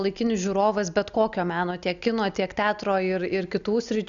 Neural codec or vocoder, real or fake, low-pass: none; real; 7.2 kHz